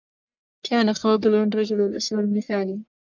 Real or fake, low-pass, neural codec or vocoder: fake; 7.2 kHz; codec, 44.1 kHz, 1.7 kbps, Pupu-Codec